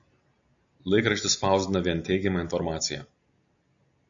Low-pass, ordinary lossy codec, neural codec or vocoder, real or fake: 7.2 kHz; AAC, 64 kbps; none; real